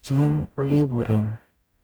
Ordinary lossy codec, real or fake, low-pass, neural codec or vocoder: none; fake; none; codec, 44.1 kHz, 0.9 kbps, DAC